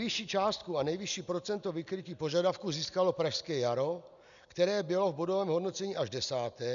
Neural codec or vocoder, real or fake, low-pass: none; real; 7.2 kHz